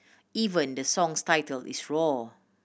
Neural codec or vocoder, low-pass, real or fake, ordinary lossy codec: none; none; real; none